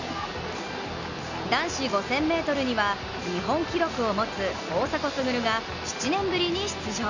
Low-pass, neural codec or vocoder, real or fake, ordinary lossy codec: 7.2 kHz; none; real; none